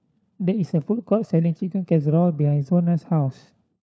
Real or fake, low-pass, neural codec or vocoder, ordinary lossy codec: fake; none; codec, 16 kHz, 4 kbps, FunCodec, trained on LibriTTS, 50 frames a second; none